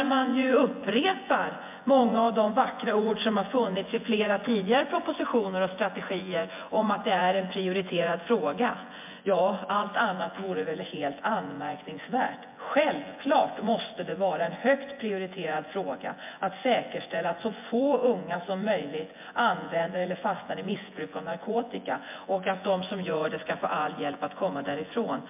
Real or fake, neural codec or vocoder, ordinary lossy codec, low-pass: fake; vocoder, 24 kHz, 100 mel bands, Vocos; MP3, 32 kbps; 3.6 kHz